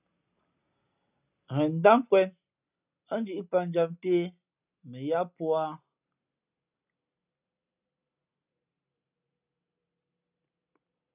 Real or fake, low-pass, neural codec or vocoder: real; 3.6 kHz; none